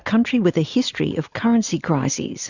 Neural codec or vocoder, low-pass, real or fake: none; 7.2 kHz; real